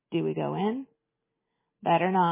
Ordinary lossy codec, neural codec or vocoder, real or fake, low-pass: MP3, 16 kbps; none; real; 3.6 kHz